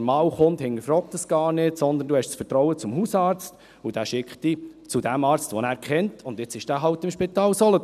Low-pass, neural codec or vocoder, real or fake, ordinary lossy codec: 14.4 kHz; none; real; none